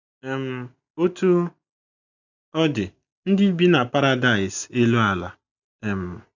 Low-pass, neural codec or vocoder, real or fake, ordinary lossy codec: 7.2 kHz; none; real; none